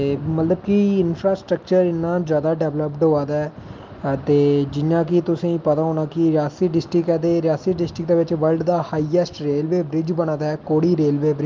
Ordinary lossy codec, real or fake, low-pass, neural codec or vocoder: Opus, 24 kbps; real; 7.2 kHz; none